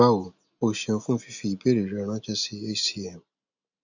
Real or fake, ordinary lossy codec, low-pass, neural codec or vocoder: real; none; 7.2 kHz; none